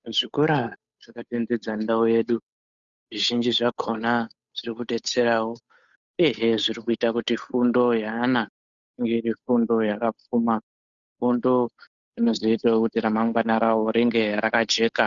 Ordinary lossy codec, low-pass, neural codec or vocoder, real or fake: Opus, 64 kbps; 7.2 kHz; codec, 16 kHz, 8 kbps, FunCodec, trained on Chinese and English, 25 frames a second; fake